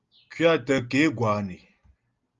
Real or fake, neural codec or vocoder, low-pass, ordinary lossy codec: real; none; 7.2 kHz; Opus, 32 kbps